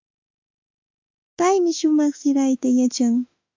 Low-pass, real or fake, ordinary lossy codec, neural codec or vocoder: 7.2 kHz; fake; MP3, 64 kbps; autoencoder, 48 kHz, 32 numbers a frame, DAC-VAE, trained on Japanese speech